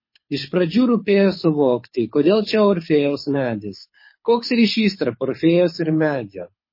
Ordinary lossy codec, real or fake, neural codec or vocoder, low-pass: MP3, 24 kbps; fake; codec, 24 kHz, 6 kbps, HILCodec; 5.4 kHz